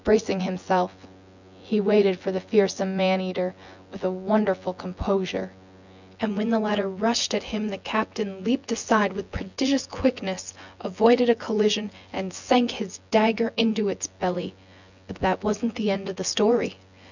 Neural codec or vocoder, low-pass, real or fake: vocoder, 24 kHz, 100 mel bands, Vocos; 7.2 kHz; fake